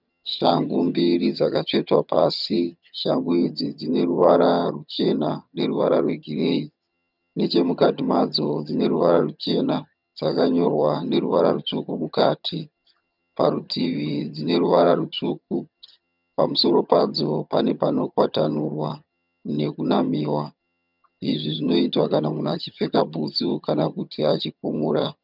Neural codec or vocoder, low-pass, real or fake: vocoder, 22.05 kHz, 80 mel bands, HiFi-GAN; 5.4 kHz; fake